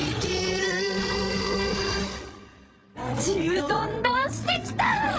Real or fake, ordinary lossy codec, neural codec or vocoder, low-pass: fake; none; codec, 16 kHz, 8 kbps, FreqCodec, larger model; none